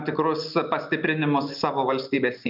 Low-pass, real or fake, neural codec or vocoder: 5.4 kHz; real; none